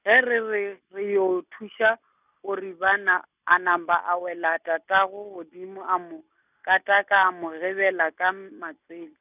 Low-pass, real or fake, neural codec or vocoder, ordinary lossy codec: 3.6 kHz; real; none; none